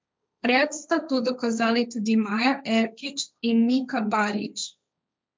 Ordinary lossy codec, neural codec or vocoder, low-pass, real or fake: none; codec, 16 kHz, 1.1 kbps, Voila-Tokenizer; none; fake